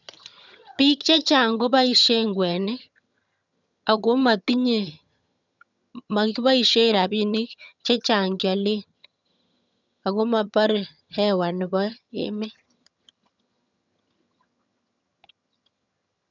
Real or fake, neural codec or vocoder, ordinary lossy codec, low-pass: fake; vocoder, 22.05 kHz, 80 mel bands, HiFi-GAN; none; 7.2 kHz